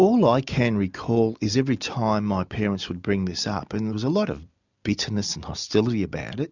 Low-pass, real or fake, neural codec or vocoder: 7.2 kHz; real; none